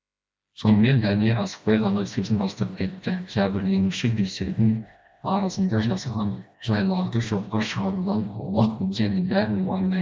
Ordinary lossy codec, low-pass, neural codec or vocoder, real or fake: none; none; codec, 16 kHz, 1 kbps, FreqCodec, smaller model; fake